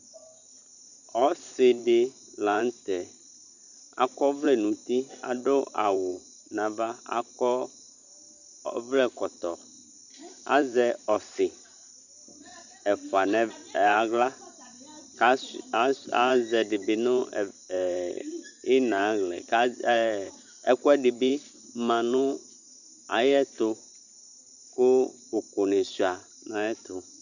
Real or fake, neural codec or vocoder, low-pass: fake; vocoder, 44.1 kHz, 128 mel bands every 512 samples, BigVGAN v2; 7.2 kHz